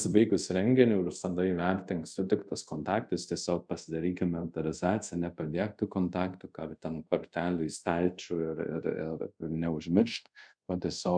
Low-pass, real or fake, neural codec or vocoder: 9.9 kHz; fake; codec, 24 kHz, 0.5 kbps, DualCodec